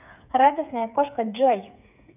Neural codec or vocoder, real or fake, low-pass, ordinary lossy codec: codec, 16 kHz, 8 kbps, FreqCodec, smaller model; fake; 3.6 kHz; none